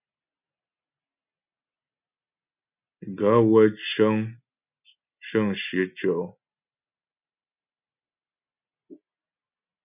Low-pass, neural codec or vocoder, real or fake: 3.6 kHz; none; real